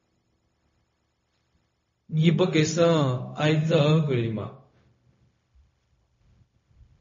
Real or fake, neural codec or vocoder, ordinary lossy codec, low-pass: fake; codec, 16 kHz, 0.4 kbps, LongCat-Audio-Codec; MP3, 32 kbps; 7.2 kHz